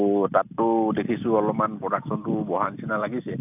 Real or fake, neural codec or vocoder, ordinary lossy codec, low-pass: real; none; AAC, 32 kbps; 3.6 kHz